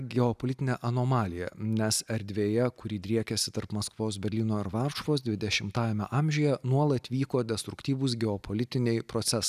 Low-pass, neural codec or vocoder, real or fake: 14.4 kHz; none; real